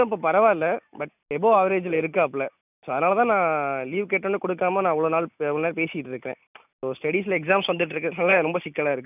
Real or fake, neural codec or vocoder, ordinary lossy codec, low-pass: real; none; none; 3.6 kHz